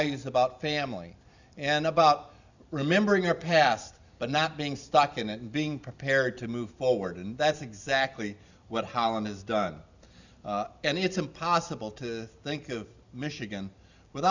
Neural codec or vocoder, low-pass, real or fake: none; 7.2 kHz; real